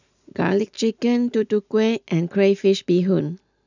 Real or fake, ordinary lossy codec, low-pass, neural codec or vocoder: real; none; 7.2 kHz; none